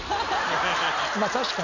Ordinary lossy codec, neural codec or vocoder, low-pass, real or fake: none; none; 7.2 kHz; real